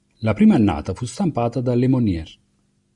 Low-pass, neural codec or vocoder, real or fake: 10.8 kHz; none; real